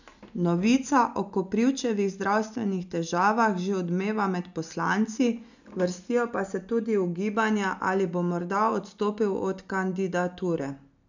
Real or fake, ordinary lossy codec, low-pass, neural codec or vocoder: real; none; 7.2 kHz; none